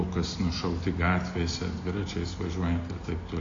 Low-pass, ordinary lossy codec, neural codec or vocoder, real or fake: 7.2 kHz; AAC, 32 kbps; none; real